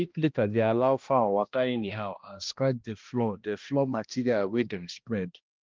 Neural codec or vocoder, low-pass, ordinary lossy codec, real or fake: codec, 16 kHz, 1 kbps, X-Codec, HuBERT features, trained on balanced general audio; 7.2 kHz; Opus, 32 kbps; fake